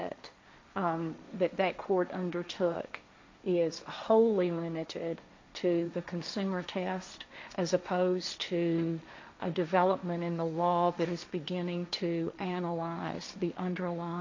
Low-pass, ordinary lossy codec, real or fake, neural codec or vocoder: 7.2 kHz; MP3, 48 kbps; fake; codec, 16 kHz, 1.1 kbps, Voila-Tokenizer